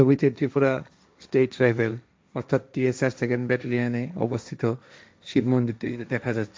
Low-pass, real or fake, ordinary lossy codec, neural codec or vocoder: none; fake; none; codec, 16 kHz, 1.1 kbps, Voila-Tokenizer